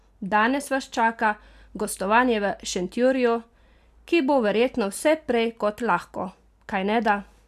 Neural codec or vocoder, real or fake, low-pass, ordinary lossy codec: none; real; 14.4 kHz; none